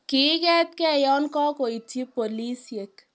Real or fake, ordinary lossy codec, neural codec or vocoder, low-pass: real; none; none; none